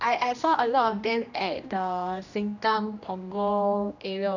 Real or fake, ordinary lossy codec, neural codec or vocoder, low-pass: fake; none; codec, 16 kHz, 1 kbps, X-Codec, HuBERT features, trained on general audio; 7.2 kHz